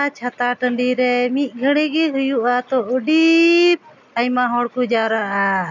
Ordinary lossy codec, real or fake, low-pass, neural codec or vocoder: none; real; 7.2 kHz; none